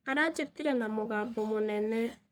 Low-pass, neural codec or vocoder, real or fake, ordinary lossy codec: none; codec, 44.1 kHz, 3.4 kbps, Pupu-Codec; fake; none